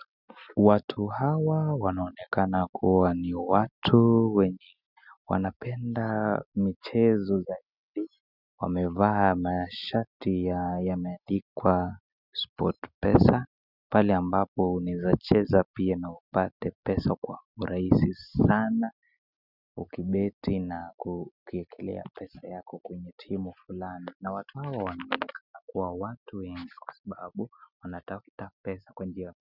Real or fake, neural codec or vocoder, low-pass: real; none; 5.4 kHz